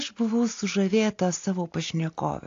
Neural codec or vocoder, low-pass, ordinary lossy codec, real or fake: none; 7.2 kHz; AAC, 48 kbps; real